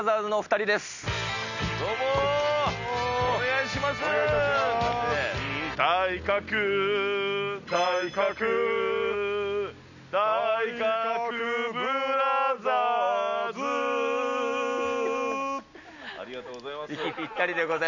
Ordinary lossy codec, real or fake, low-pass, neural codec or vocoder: none; real; 7.2 kHz; none